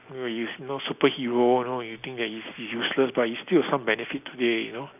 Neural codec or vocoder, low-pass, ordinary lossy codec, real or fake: none; 3.6 kHz; none; real